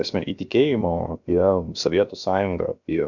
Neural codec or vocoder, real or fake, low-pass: codec, 16 kHz, about 1 kbps, DyCAST, with the encoder's durations; fake; 7.2 kHz